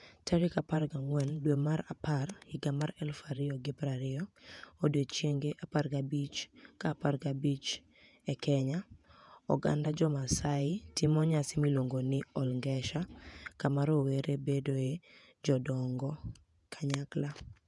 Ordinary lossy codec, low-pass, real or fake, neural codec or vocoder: none; 10.8 kHz; fake; vocoder, 48 kHz, 128 mel bands, Vocos